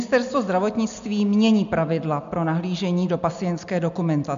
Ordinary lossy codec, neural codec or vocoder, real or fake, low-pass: MP3, 64 kbps; none; real; 7.2 kHz